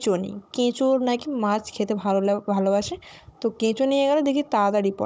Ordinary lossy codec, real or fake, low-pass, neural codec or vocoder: none; fake; none; codec, 16 kHz, 16 kbps, FunCodec, trained on Chinese and English, 50 frames a second